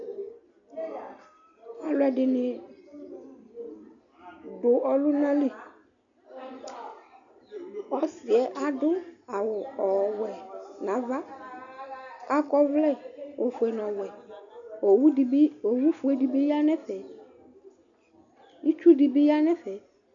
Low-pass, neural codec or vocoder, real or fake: 7.2 kHz; none; real